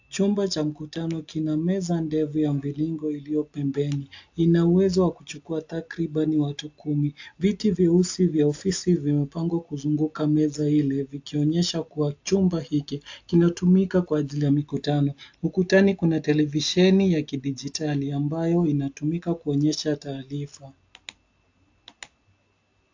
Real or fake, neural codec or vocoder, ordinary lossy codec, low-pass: real; none; AAC, 48 kbps; 7.2 kHz